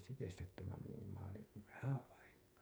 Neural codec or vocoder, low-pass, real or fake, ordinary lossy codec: codec, 44.1 kHz, 2.6 kbps, SNAC; none; fake; none